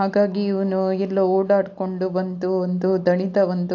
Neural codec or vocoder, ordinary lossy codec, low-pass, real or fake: none; AAC, 48 kbps; 7.2 kHz; real